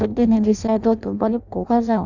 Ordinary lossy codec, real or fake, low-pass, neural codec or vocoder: none; fake; 7.2 kHz; codec, 16 kHz in and 24 kHz out, 0.6 kbps, FireRedTTS-2 codec